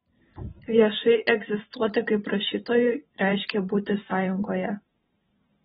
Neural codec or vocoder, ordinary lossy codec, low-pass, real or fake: vocoder, 44.1 kHz, 128 mel bands every 512 samples, BigVGAN v2; AAC, 16 kbps; 19.8 kHz; fake